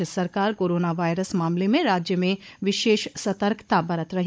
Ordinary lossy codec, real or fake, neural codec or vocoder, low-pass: none; fake; codec, 16 kHz, 16 kbps, FunCodec, trained on Chinese and English, 50 frames a second; none